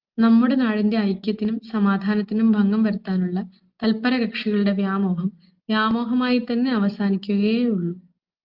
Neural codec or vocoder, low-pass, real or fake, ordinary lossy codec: none; 5.4 kHz; real; Opus, 24 kbps